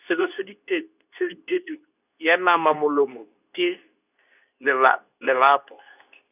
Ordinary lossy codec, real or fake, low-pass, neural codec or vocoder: none; fake; 3.6 kHz; codec, 24 kHz, 0.9 kbps, WavTokenizer, medium speech release version 2